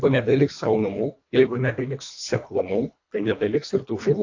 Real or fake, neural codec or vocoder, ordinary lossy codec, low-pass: fake; codec, 24 kHz, 1.5 kbps, HILCodec; AAC, 48 kbps; 7.2 kHz